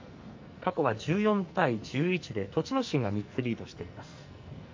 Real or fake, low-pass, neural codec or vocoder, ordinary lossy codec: fake; 7.2 kHz; codec, 44.1 kHz, 2.6 kbps, SNAC; MP3, 48 kbps